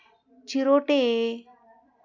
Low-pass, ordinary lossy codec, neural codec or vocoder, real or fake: 7.2 kHz; AAC, 48 kbps; none; real